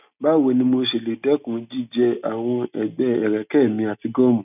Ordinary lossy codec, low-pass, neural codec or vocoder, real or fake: none; 3.6 kHz; none; real